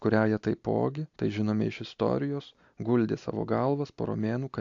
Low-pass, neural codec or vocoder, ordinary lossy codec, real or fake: 7.2 kHz; none; AAC, 64 kbps; real